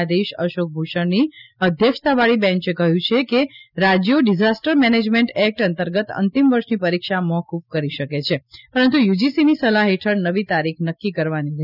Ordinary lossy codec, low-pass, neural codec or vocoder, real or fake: none; 5.4 kHz; none; real